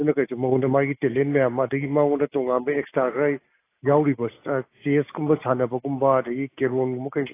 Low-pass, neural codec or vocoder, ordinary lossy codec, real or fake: 3.6 kHz; none; AAC, 24 kbps; real